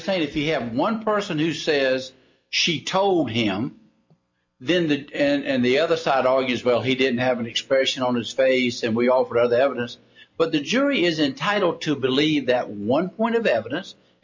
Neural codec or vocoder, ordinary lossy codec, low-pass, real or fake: none; MP3, 48 kbps; 7.2 kHz; real